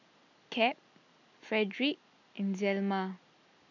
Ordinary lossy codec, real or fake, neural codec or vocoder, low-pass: none; real; none; 7.2 kHz